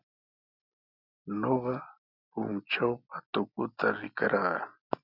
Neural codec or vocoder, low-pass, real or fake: none; 5.4 kHz; real